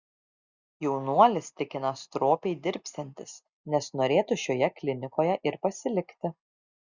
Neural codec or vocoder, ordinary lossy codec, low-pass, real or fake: none; Opus, 64 kbps; 7.2 kHz; real